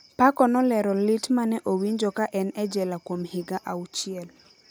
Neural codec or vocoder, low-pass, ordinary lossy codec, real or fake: none; none; none; real